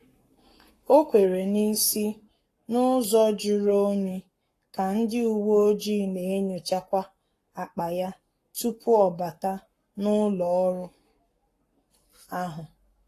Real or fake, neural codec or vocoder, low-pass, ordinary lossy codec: fake; codec, 44.1 kHz, 7.8 kbps, Pupu-Codec; 14.4 kHz; AAC, 48 kbps